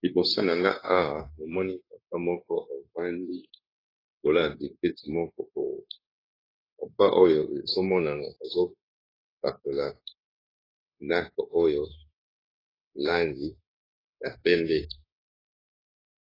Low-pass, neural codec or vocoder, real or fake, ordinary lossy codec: 5.4 kHz; codec, 16 kHz, 0.9 kbps, LongCat-Audio-Codec; fake; AAC, 24 kbps